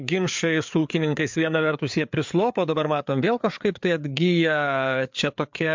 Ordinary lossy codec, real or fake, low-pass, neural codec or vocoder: MP3, 64 kbps; fake; 7.2 kHz; codec, 16 kHz, 4 kbps, FreqCodec, larger model